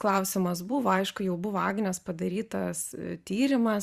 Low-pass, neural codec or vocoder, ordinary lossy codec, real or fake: 14.4 kHz; vocoder, 44.1 kHz, 128 mel bands every 512 samples, BigVGAN v2; Opus, 64 kbps; fake